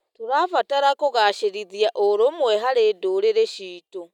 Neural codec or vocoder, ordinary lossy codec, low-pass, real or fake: none; none; 19.8 kHz; real